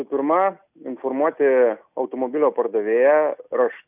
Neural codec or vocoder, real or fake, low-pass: none; real; 3.6 kHz